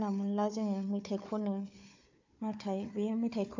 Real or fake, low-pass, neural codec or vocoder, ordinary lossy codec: fake; 7.2 kHz; codec, 16 kHz, 4 kbps, FunCodec, trained on Chinese and English, 50 frames a second; MP3, 64 kbps